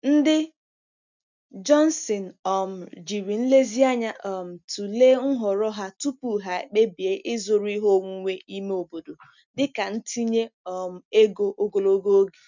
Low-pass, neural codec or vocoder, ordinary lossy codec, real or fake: 7.2 kHz; none; none; real